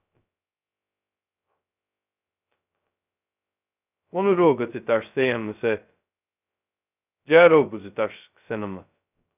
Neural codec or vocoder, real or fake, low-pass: codec, 16 kHz, 0.2 kbps, FocalCodec; fake; 3.6 kHz